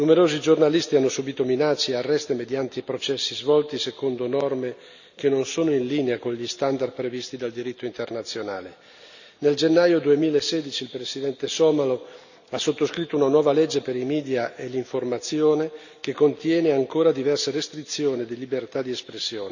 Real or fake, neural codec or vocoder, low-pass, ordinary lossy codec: real; none; 7.2 kHz; none